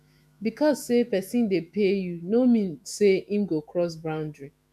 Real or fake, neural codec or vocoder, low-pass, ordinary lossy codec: fake; autoencoder, 48 kHz, 128 numbers a frame, DAC-VAE, trained on Japanese speech; 14.4 kHz; none